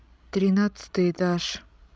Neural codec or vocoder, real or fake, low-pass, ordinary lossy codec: codec, 16 kHz, 16 kbps, FreqCodec, larger model; fake; none; none